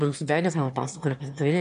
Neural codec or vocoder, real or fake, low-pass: autoencoder, 22.05 kHz, a latent of 192 numbers a frame, VITS, trained on one speaker; fake; 9.9 kHz